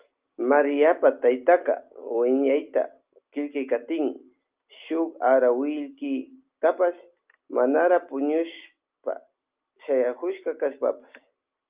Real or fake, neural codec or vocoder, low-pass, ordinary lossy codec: real; none; 3.6 kHz; Opus, 24 kbps